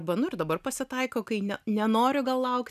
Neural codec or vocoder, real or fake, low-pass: none; real; 14.4 kHz